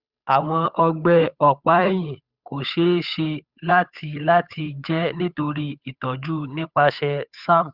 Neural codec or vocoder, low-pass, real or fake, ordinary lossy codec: codec, 16 kHz, 8 kbps, FunCodec, trained on Chinese and English, 25 frames a second; 5.4 kHz; fake; Opus, 64 kbps